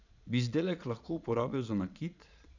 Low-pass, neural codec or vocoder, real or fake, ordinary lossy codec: 7.2 kHz; vocoder, 22.05 kHz, 80 mel bands, Vocos; fake; none